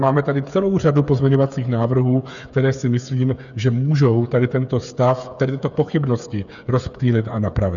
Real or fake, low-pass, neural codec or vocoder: fake; 7.2 kHz; codec, 16 kHz, 8 kbps, FreqCodec, smaller model